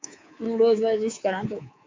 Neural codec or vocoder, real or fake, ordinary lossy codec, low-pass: codec, 24 kHz, 3.1 kbps, DualCodec; fake; MP3, 64 kbps; 7.2 kHz